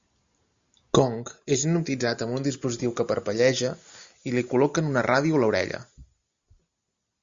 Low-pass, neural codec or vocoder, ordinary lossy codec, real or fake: 7.2 kHz; none; Opus, 64 kbps; real